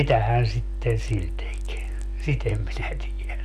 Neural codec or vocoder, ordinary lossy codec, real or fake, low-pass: none; none; real; 14.4 kHz